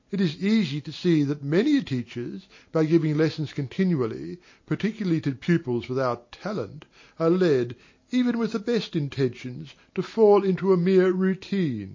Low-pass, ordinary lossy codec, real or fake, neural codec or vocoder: 7.2 kHz; MP3, 32 kbps; real; none